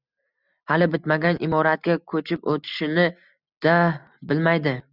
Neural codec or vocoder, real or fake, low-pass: none; real; 5.4 kHz